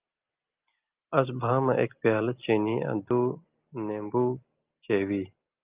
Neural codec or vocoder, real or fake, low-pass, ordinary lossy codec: none; real; 3.6 kHz; Opus, 24 kbps